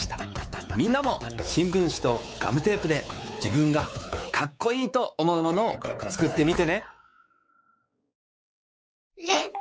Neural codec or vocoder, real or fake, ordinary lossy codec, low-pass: codec, 16 kHz, 4 kbps, X-Codec, WavLM features, trained on Multilingual LibriSpeech; fake; none; none